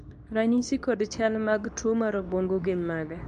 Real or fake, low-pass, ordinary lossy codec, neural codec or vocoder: fake; 10.8 kHz; none; codec, 24 kHz, 0.9 kbps, WavTokenizer, medium speech release version 2